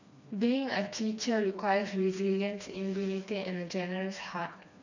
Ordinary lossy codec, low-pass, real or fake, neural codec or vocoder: none; 7.2 kHz; fake; codec, 16 kHz, 2 kbps, FreqCodec, smaller model